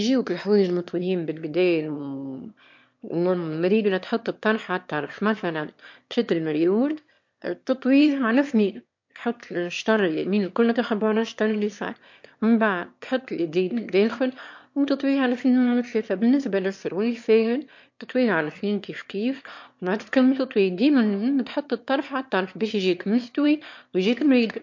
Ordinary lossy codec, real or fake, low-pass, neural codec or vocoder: MP3, 48 kbps; fake; 7.2 kHz; autoencoder, 22.05 kHz, a latent of 192 numbers a frame, VITS, trained on one speaker